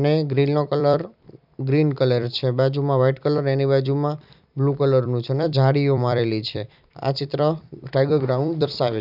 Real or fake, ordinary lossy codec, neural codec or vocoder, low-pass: fake; none; vocoder, 44.1 kHz, 128 mel bands every 256 samples, BigVGAN v2; 5.4 kHz